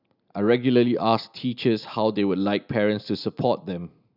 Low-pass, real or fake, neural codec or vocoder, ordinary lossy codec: 5.4 kHz; real; none; none